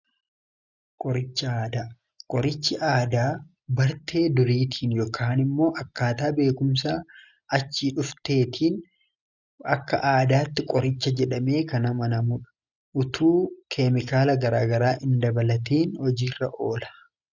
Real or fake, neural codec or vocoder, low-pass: real; none; 7.2 kHz